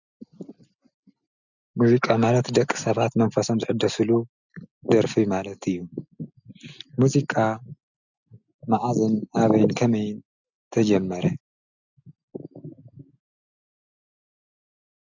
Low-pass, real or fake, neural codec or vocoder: 7.2 kHz; real; none